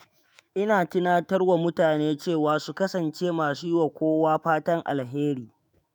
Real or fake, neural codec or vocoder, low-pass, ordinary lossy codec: fake; autoencoder, 48 kHz, 128 numbers a frame, DAC-VAE, trained on Japanese speech; none; none